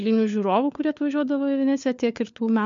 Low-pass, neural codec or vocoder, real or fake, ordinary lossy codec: 7.2 kHz; codec, 16 kHz, 4 kbps, FunCodec, trained on LibriTTS, 50 frames a second; fake; MP3, 64 kbps